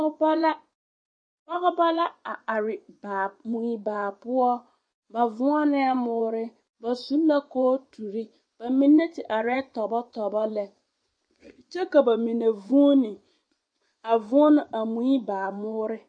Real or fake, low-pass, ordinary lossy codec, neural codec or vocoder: fake; 9.9 kHz; MP3, 48 kbps; vocoder, 24 kHz, 100 mel bands, Vocos